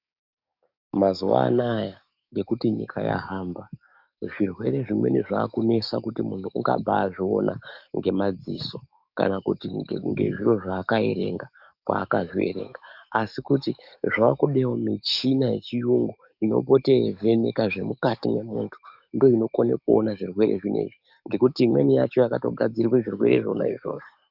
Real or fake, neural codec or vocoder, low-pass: fake; codec, 44.1 kHz, 7.8 kbps, DAC; 5.4 kHz